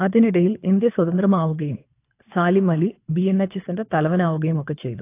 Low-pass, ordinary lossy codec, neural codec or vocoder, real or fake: 3.6 kHz; AAC, 24 kbps; codec, 24 kHz, 3 kbps, HILCodec; fake